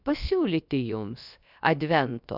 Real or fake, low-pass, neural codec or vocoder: fake; 5.4 kHz; codec, 16 kHz, about 1 kbps, DyCAST, with the encoder's durations